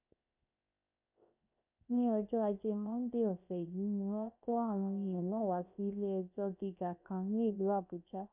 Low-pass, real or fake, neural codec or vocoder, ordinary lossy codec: 3.6 kHz; fake; codec, 16 kHz, 0.7 kbps, FocalCodec; none